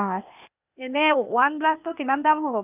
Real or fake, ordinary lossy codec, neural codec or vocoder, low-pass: fake; none; codec, 16 kHz, 0.8 kbps, ZipCodec; 3.6 kHz